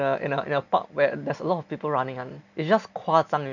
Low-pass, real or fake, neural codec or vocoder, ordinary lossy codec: 7.2 kHz; real; none; none